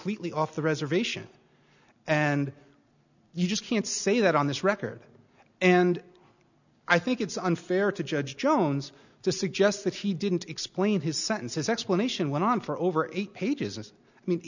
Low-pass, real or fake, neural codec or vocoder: 7.2 kHz; real; none